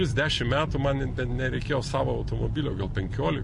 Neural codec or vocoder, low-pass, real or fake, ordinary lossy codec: none; 10.8 kHz; real; MP3, 48 kbps